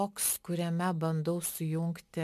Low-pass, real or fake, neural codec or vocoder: 14.4 kHz; real; none